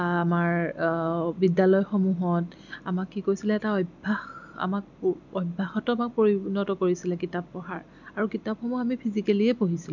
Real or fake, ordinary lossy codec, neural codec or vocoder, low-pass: real; none; none; 7.2 kHz